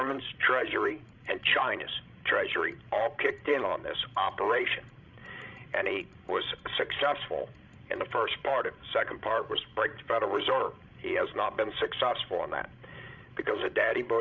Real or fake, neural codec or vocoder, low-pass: fake; codec, 16 kHz, 16 kbps, FreqCodec, larger model; 7.2 kHz